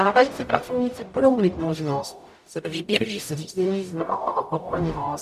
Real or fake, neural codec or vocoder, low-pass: fake; codec, 44.1 kHz, 0.9 kbps, DAC; 14.4 kHz